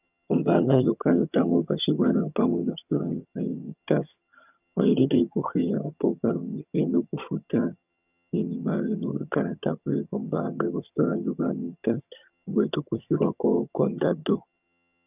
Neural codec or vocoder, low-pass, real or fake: vocoder, 22.05 kHz, 80 mel bands, HiFi-GAN; 3.6 kHz; fake